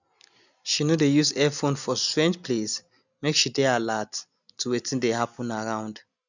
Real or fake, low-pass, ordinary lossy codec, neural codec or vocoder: real; 7.2 kHz; none; none